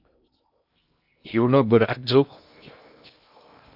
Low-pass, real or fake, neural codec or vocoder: 5.4 kHz; fake; codec, 16 kHz in and 24 kHz out, 0.6 kbps, FocalCodec, streaming, 2048 codes